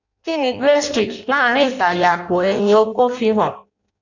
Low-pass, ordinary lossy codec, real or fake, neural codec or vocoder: 7.2 kHz; none; fake; codec, 16 kHz in and 24 kHz out, 0.6 kbps, FireRedTTS-2 codec